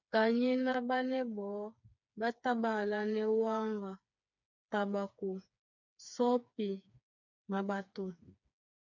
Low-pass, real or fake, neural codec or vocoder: 7.2 kHz; fake; codec, 16 kHz, 4 kbps, FreqCodec, smaller model